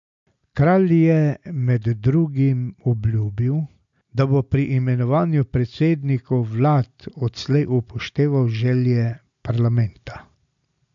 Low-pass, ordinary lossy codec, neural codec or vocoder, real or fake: 7.2 kHz; MP3, 64 kbps; none; real